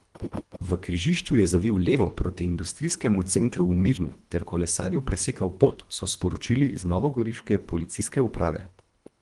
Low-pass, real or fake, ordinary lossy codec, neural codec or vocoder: 10.8 kHz; fake; Opus, 24 kbps; codec, 24 kHz, 1.5 kbps, HILCodec